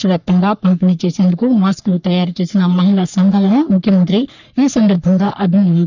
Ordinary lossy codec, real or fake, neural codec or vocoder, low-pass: none; fake; codec, 44.1 kHz, 3.4 kbps, Pupu-Codec; 7.2 kHz